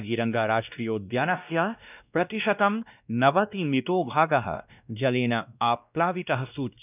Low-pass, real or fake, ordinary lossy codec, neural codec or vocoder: 3.6 kHz; fake; none; codec, 16 kHz, 1 kbps, X-Codec, WavLM features, trained on Multilingual LibriSpeech